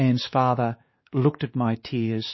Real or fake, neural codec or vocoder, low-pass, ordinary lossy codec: fake; codec, 16 kHz, 2 kbps, X-Codec, WavLM features, trained on Multilingual LibriSpeech; 7.2 kHz; MP3, 24 kbps